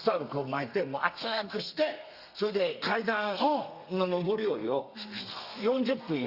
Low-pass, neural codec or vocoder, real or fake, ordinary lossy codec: 5.4 kHz; codec, 32 kHz, 1.9 kbps, SNAC; fake; Opus, 64 kbps